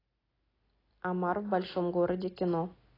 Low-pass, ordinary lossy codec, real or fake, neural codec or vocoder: 5.4 kHz; AAC, 24 kbps; real; none